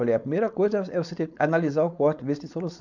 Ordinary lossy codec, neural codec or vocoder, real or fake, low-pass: none; codec, 16 kHz, 4.8 kbps, FACodec; fake; 7.2 kHz